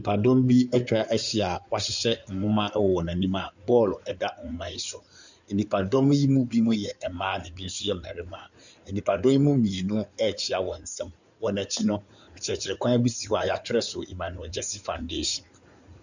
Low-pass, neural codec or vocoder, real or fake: 7.2 kHz; codec, 16 kHz in and 24 kHz out, 2.2 kbps, FireRedTTS-2 codec; fake